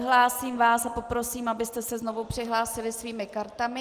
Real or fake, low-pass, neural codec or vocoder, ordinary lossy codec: real; 14.4 kHz; none; Opus, 24 kbps